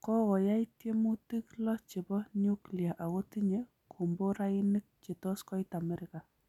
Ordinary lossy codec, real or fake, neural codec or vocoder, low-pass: none; real; none; 19.8 kHz